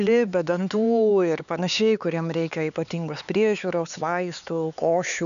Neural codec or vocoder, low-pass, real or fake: codec, 16 kHz, 4 kbps, X-Codec, HuBERT features, trained on LibriSpeech; 7.2 kHz; fake